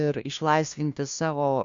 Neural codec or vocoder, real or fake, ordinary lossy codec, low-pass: codec, 16 kHz, 1 kbps, FunCodec, trained on LibriTTS, 50 frames a second; fake; Opus, 64 kbps; 7.2 kHz